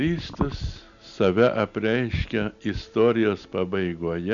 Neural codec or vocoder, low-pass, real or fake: none; 7.2 kHz; real